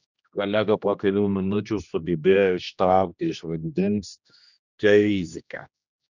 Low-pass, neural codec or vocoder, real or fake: 7.2 kHz; codec, 16 kHz, 1 kbps, X-Codec, HuBERT features, trained on general audio; fake